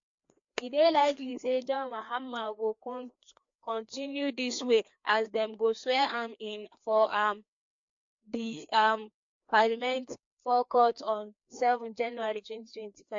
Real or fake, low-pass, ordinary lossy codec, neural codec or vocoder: fake; 7.2 kHz; MP3, 48 kbps; codec, 16 kHz, 2 kbps, FreqCodec, larger model